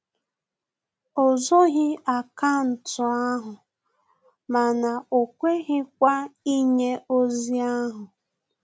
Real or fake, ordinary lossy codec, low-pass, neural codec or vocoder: real; none; none; none